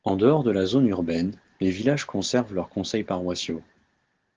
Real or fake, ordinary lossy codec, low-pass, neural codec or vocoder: real; Opus, 16 kbps; 7.2 kHz; none